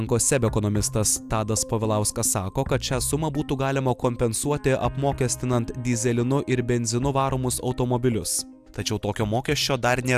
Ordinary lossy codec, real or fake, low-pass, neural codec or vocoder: AAC, 96 kbps; real; 14.4 kHz; none